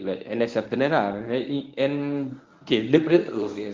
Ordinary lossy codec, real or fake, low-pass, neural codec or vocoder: Opus, 24 kbps; fake; 7.2 kHz; codec, 24 kHz, 0.9 kbps, WavTokenizer, medium speech release version 1